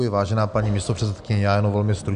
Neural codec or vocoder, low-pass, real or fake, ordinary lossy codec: none; 9.9 kHz; real; MP3, 64 kbps